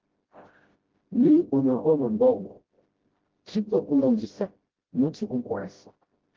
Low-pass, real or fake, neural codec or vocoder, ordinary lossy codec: 7.2 kHz; fake; codec, 16 kHz, 0.5 kbps, FreqCodec, smaller model; Opus, 16 kbps